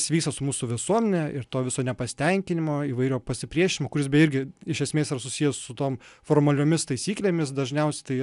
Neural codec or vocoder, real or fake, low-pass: none; real; 10.8 kHz